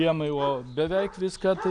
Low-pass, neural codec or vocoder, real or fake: 9.9 kHz; none; real